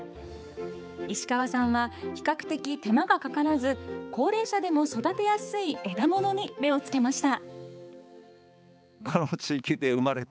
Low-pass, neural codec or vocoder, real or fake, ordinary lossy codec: none; codec, 16 kHz, 4 kbps, X-Codec, HuBERT features, trained on balanced general audio; fake; none